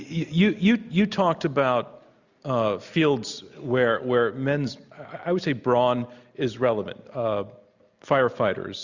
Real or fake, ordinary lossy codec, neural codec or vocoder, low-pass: real; Opus, 64 kbps; none; 7.2 kHz